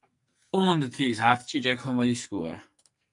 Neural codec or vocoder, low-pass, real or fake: codec, 44.1 kHz, 2.6 kbps, SNAC; 10.8 kHz; fake